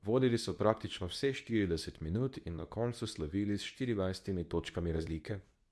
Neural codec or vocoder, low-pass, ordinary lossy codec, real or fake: codec, 24 kHz, 0.9 kbps, WavTokenizer, medium speech release version 2; none; none; fake